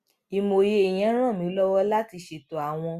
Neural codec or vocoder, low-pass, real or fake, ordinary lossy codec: none; 14.4 kHz; real; Opus, 64 kbps